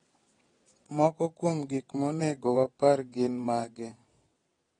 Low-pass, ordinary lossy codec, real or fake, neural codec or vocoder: 9.9 kHz; AAC, 32 kbps; fake; vocoder, 22.05 kHz, 80 mel bands, WaveNeXt